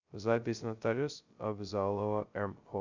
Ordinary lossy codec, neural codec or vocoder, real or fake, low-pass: Opus, 64 kbps; codec, 16 kHz, 0.2 kbps, FocalCodec; fake; 7.2 kHz